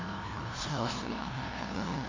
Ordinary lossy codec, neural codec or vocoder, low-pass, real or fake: MP3, 48 kbps; codec, 16 kHz, 0.5 kbps, FunCodec, trained on LibriTTS, 25 frames a second; 7.2 kHz; fake